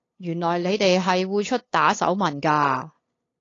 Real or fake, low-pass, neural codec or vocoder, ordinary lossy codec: fake; 7.2 kHz; codec, 16 kHz, 8 kbps, FunCodec, trained on LibriTTS, 25 frames a second; AAC, 32 kbps